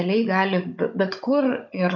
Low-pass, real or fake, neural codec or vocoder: 7.2 kHz; fake; codec, 16 kHz, 16 kbps, FunCodec, trained on Chinese and English, 50 frames a second